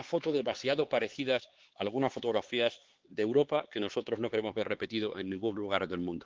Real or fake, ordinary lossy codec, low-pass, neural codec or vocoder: fake; Opus, 16 kbps; 7.2 kHz; codec, 16 kHz, 4 kbps, X-Codec, HuBERT features, trained on LibriSpeech